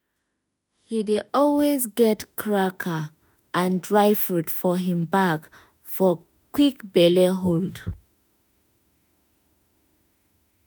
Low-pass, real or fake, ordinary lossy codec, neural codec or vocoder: none; fake; none; autoencoder, 48 kHz, 32 numbers a frame, DAC-VAE, trained on Japanese speech